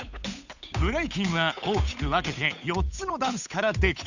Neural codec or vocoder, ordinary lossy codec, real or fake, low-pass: codec, 16 kHz, 8 kbps, FunCodec, trained on Chinese and English, 25 frames a second; none; fake; 7.2 kHz